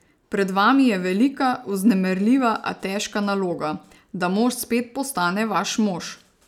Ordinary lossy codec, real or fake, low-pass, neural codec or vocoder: none; real; 19.8 kHz; none